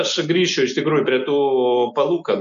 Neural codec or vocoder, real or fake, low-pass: none; real; 7.2 kHz